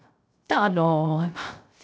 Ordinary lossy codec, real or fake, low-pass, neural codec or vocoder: none; fake; none; codec, 16 kHz, 0.3 kbps, FocalCodec